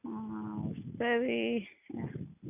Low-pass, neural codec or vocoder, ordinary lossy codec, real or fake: 3.6 kHz; none; none; real